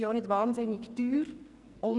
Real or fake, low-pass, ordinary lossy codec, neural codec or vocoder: fake; 10.8 kHz; none; codec, 44.1 kHz, 2.6 kbps, SNAC